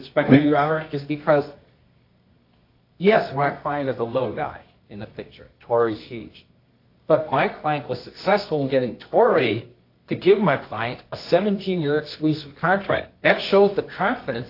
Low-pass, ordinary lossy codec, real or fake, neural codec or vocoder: 5.4 kHz; AAC, 32 kbps; fake; codec, 24 kHz, 0.9 kbps, WavTokenizer, medium music audio release